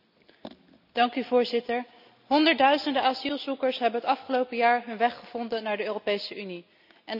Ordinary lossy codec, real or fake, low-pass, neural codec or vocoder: none; real; 5.4 kHz; none